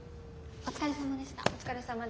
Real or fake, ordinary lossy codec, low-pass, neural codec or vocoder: real; none; none; none